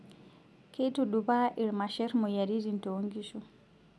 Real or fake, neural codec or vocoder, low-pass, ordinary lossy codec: real; none; none; none